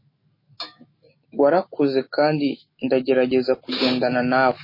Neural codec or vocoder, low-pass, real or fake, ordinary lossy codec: autoencoder, 48 kHz, 128 numbers a frame, DAC-VAE, trained on Japanese speech; 5.4 kHz; fake; MP3, 24 kbps